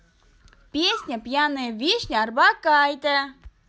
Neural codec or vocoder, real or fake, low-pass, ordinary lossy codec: none; real; none; none